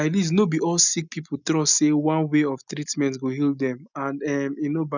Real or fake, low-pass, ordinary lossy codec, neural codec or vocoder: real; 7.2 kHz; none; none